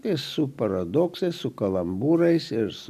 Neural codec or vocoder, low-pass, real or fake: none; 14.4 kHz; real